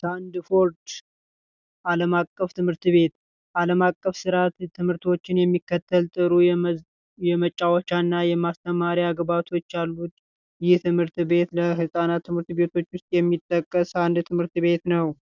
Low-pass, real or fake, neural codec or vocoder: 7.2 kHz; real; none